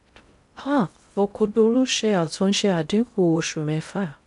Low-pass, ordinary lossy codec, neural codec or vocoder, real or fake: 10.8 kHz; none; codec, 16 kHz in and 24 kHz out, 0.6 kbps, FocalCodec, streaming, 2048 codes; fake